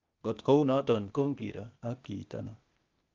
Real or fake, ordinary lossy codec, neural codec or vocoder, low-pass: fake; Opus, 32 kbps; codec, 16 kHz, 0.8 kbps, ZipCodec; 7.2 kHz